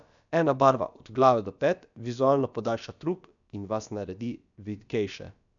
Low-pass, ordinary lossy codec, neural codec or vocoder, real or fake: 7.2 kHz; none; codec, 16 kHz, about 1 kbps, DyCAST, with the encoder's durations; fake